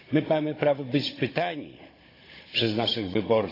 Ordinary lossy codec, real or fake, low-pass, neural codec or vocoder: AAC, 24 kbps; fake; 5.4 kHz; codec, 16 kHz, 16 kbps, FunCodec, trained on Chinese and English, 50 frames a second